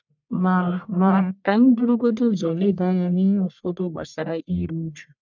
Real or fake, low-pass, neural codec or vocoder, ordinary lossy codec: fake; 7.2 kHz; codec, 44.1 kHz, 1.7 kbps, Pupu-Codec; none